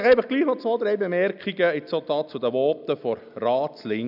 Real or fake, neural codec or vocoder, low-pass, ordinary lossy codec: real; none; 5.4 kHz; none